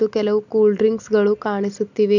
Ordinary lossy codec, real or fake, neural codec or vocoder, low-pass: none; real; none; 7.2 kHz